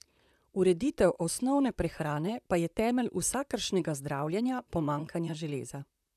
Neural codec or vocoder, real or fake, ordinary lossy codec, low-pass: vocoder, 44.1 kHz, 128 mel bands, Pupu-Vocoder; fake; none; 14.4 kHz